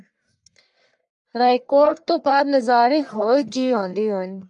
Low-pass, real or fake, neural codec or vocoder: 10.8 kHz; fake; codec, 44.1 kHz, 1.7 kbps, Pupu-Codec